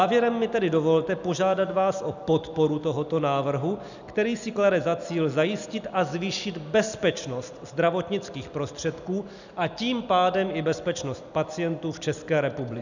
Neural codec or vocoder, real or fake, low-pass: none; real; 7.2 kHz